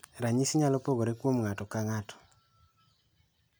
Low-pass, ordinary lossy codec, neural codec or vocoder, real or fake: none; none; none; real